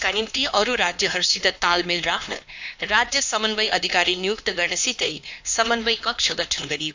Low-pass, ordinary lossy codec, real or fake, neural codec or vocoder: 7.2 kHz; AAC, 48 kbps; fake; codec, 16 kHz, 2 kbps, X-Codec, HuBERT features, trained on LibriSpeech